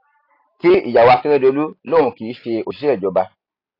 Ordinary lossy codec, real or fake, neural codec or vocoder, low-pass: AAC, 32 kbps; real; none; 5.4 kHz